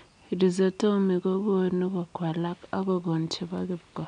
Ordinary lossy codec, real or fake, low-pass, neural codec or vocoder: none; real; 9.9 kHz; none